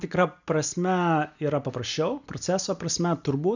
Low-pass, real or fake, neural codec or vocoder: 7.2 kHz; real; none